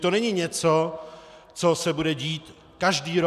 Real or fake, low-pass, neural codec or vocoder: real; 14.4 kHz; none